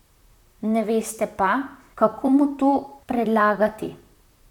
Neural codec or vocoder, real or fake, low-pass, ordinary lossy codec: vocoder, 44.1 kHz, 128 mel bands, Pupu-Vocoder; fake; 19.8 kHz; none